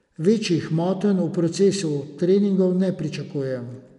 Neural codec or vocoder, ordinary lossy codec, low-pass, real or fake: none; none; 10.8 kHz; real